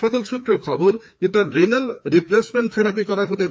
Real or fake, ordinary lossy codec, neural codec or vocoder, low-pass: fake; none; codec, 16 kHz, 2 kbps, FreqCodec, larger model; none